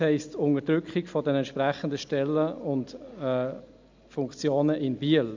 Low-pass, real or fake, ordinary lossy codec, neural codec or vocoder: 7.2 kHz; real; MP3, 64 kbps; none